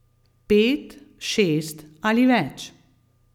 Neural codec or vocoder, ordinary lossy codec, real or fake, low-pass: none; none; real; 19.8 kHz